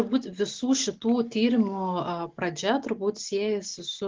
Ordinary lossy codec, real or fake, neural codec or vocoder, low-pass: Opus, 16 kbps; real; none; 7.2 kHz